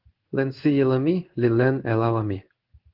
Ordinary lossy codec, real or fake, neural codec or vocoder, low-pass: Opus, 16 kbps; fake; codec, 16 kHz in and 24 kHz out, 1 kbps, XY-Tokenizer; 5.4 kHz